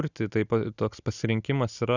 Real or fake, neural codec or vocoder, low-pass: real; none; 7.2 kHz